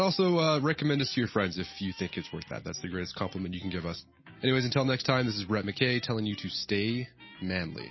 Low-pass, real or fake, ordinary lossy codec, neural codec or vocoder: 7.2 kHz; real; MP3, 24 kbps; none